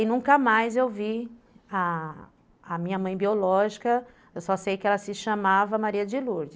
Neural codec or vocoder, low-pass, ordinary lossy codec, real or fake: none; none; none; real